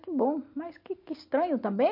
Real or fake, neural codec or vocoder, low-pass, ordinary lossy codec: real; none; 5.4 kHz; MP3, 32 kbps